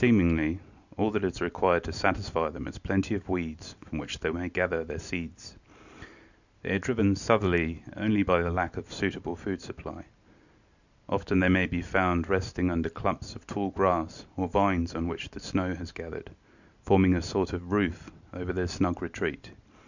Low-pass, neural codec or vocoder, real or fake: 7.2 kHz; none; real